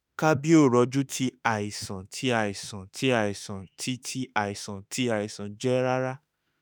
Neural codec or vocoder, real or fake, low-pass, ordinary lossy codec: autoencoder, 48 kHz, 32 numbers a frame, DAC-VAE, trained on Japanese speech; fake; none; none